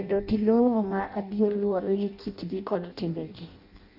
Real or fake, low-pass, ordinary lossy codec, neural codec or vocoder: fake; 5.4 kHz; none; codec, 16 kHz in and 24 kHz out, 0.6 kbps, FireRedTTS-2 codec